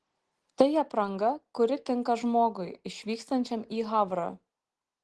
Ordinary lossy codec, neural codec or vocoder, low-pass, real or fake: Opus, 16 kbps; none; 10.8 kHz; real